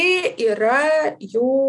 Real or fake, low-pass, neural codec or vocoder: real; 10.8 kHz; none